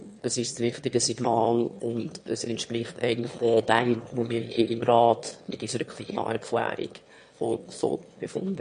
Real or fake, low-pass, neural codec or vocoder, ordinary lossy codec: fake; 9.9 kHz; autoencoder, 22.05 kHz, a latent of 192 numbers a frame, VITS, trained on one speaker; MP3, 48 kbps